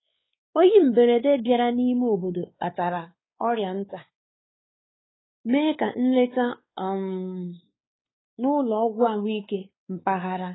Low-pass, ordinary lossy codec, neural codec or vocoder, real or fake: 7.2 kHz; AAC, 16 kbps; codec, 16 kHz, 4 kbps, X-Codec, WavLM features, trained on Multilingual LibriSpeech; fake